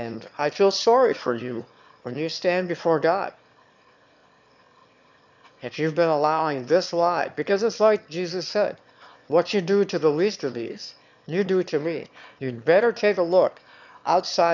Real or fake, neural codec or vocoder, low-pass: fake; autoencoder, 22.05 kHz, a latent of 192 numbers a frame, VITS, trained on one speaker; 7.2 kHz